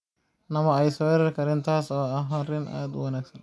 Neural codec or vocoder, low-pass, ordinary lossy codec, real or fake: none; none; none; real